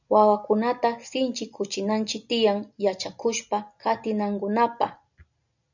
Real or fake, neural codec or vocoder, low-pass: real; none; 7.2 kHz